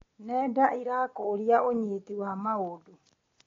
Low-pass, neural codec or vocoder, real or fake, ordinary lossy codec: 7.2 kHz; none; real; AAC, 32 kbps